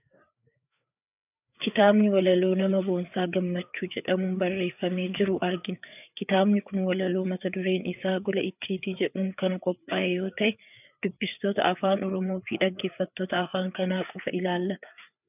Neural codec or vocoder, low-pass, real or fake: codec, 16 kHz, 4 kbps, FreqCodec, larger model; 3.6 kHz; fake